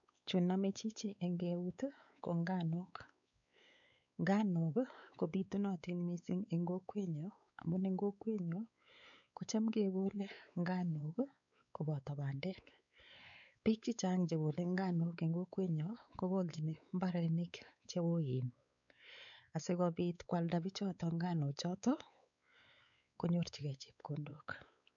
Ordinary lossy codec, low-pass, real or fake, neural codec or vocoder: none; 7.2 kHz; fake; codec, 16 kHz, 4 kbps, X-Codec, WavLM features, trained on Multilingual LibriSpeech